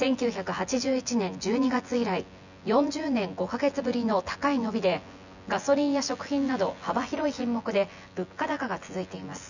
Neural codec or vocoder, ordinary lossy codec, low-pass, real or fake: vocoder, 24 kHz, 100 mel bands, Vocos; none; 7.2 kHz; fake